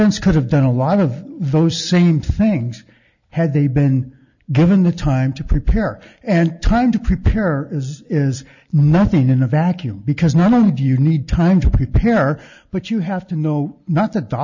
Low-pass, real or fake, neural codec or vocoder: 7.2 kHz; real; none